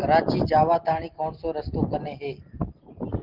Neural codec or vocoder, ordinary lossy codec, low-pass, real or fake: none; Opus, 16 kbps; 5.4 kHz; real